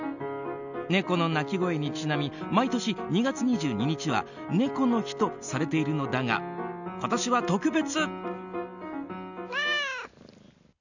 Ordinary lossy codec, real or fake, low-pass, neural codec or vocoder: none; real; 7.2 kHz; none